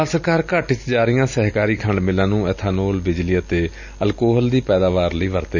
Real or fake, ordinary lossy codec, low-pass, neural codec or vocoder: real; none; 7.2 kHz; none